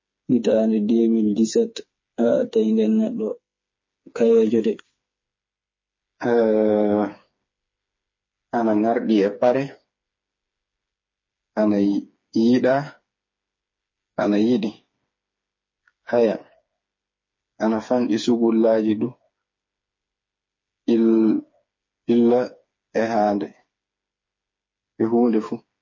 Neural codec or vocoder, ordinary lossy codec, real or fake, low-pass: codec, 16 kHz, 4 kbps, FreqCodec, smaller model; MP3, 32 kbps; fake; 7.2 kHz